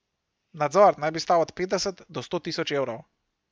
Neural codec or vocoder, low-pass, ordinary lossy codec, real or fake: none; none; none; real